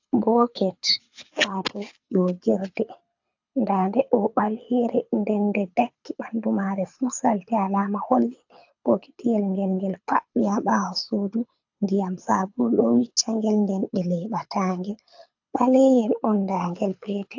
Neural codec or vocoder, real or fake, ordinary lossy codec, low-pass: codec, 24 kHz, 6 kbps, HILCodec; fake; AAC, 48 kbps; 7.2 kHz